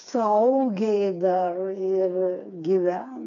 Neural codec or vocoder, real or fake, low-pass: codec, 16 kHz, 4 kbps, FreqCodec, smaller model; fake; 7.2 kHz